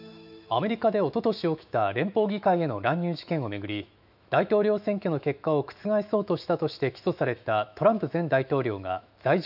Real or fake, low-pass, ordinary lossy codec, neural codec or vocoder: fake; 5.4 kHz; none; autoencoder, 48 kHz, 128 numbers a frame, DAC-VAE, trained on Japanese speech